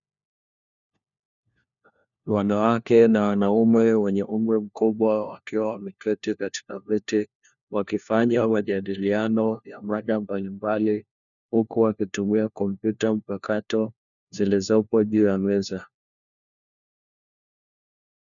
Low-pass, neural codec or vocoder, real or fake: 7.2 kHz; codec, 16 kHz, 1 kbps, FunCodec, trained on LibriTTS, 50 frames a second; fake